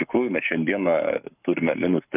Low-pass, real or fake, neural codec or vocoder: 3.6 kHz; fake; codec, 44.1 kHz, 7.8 kbps, Pupu-Codec